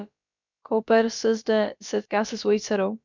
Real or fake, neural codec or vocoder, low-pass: fake; codec, 16 kHz, about 1 kbps, DyCAST, with the encoder's durations; 7.2 kHz